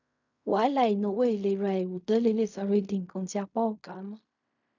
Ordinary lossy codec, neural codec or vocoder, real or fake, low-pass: none; codec, 16 kHz in and 24 kHz out, 0.4 kbps, LongCat-Audio-Codec, fine tuned four codebook decoder; fake; 7.2 kHz